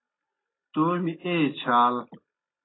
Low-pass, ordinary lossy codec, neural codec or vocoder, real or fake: 7.2 kHz; AAC, 16 kbps; none; real